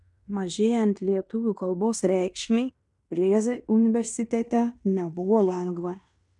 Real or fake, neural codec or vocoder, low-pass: fake; codec, 16 kHz in and 24 kHz out, 0.9 kbps, LongCat-Audio-Codec, fine tuned four codebook decoder; 10.8 kHz